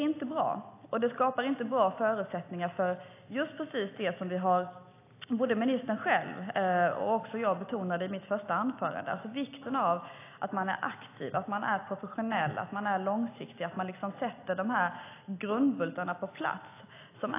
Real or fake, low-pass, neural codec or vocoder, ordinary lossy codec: real; 3.6 kHz; none; AAC, 24 kbps